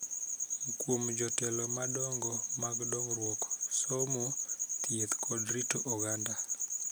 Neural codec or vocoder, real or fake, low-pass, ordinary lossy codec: none; real; none; none